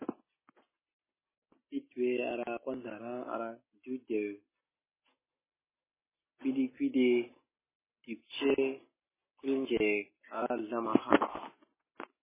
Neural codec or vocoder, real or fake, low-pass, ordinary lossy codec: none; real; 3.6 kHz; MP3, 16 kbps